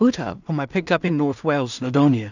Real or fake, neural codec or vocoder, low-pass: fake; codec, 16 kHz in and 24 kHz out, 0.4 kbps, LongCat-Audio-Codec, two codebook decoder; 7.2 kHz